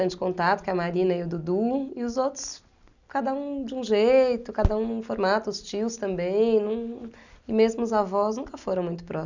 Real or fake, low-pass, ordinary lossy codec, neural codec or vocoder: real; 7.2 kHz; none; none